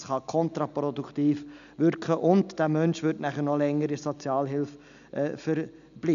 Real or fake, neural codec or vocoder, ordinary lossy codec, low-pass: real; none; none; 7.2 kHz